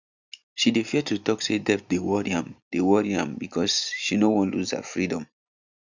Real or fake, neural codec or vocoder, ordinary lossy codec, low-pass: real; none; none; 7.2 kHz